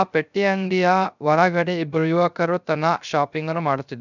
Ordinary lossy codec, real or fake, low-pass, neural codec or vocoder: none; fake; 7.2 kHz; codec, 16 kHz, 0.3 kbps, FocalCodec